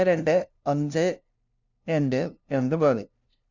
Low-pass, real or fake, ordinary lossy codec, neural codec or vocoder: 7.2 kHz; fake; none; codec, 16 kHz, 0.5 kbps, FunCodec, trained on LibriTTS, 25 frames a second